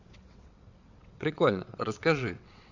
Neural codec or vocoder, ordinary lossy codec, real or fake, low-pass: vocoder, 22.05 kHz, 80 mel bands, WaveNeXt; none; fake; 7.2 kHz